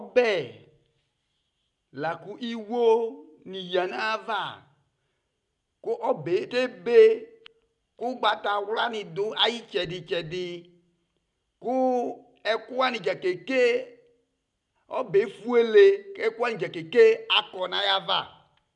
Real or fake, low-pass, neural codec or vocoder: real; 10.8 kHz; none